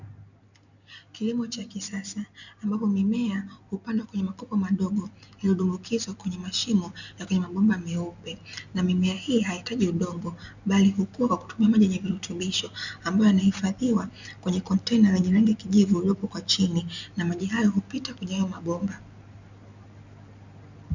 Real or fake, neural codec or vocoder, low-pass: real; none; 7.2 kHz